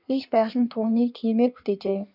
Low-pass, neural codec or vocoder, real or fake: 5.4 kHz; codec, 16 kHz in and 24 kHz out, 1.1 kbps, FireRedTTS-2 codec; fake